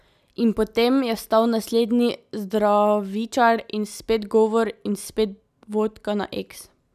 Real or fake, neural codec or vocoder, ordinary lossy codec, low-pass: real; none; none; 14.4 kHz